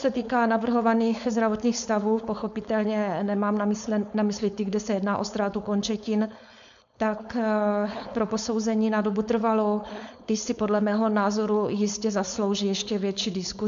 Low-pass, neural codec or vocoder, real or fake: 7.2 kHz; codec, 16 kHz, 4.8 kbps, FACodec; fake